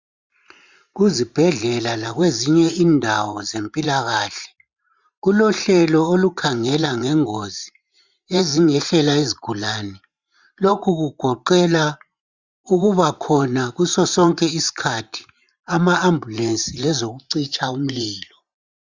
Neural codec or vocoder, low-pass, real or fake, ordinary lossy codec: vocoder, 44.1 kHz, 128 mel bands every 512 samples, BigVGAN v2; 7.2 kHz; fake; Opus, 64 kbps